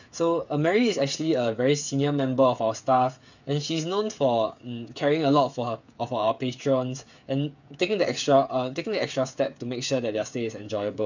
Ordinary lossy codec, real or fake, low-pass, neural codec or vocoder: none; fake; 7.2 kHz; codec, 16 kHz, 8 kbps, FreqCodec, smaller model